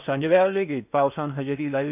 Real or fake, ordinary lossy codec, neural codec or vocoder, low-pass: fake; none; codec, 16 kHz in and 24 kHz out, 0.8 kbps, FocalCodec, streaming, 65536 codes; 3.6 kHz